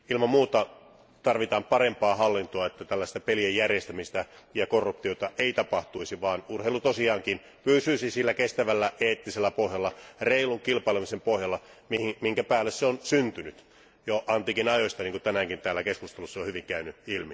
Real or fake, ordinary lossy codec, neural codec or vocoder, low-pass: real; none; none; none